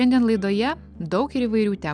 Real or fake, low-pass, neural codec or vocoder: real; 9.9 kHz; none